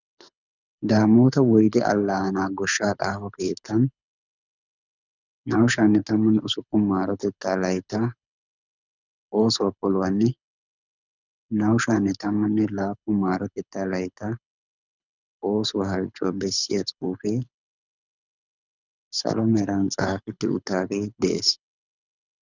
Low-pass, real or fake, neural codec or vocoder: 7.2 kHz; fake; codec, 24 kHz, 6 kbps, HILCodec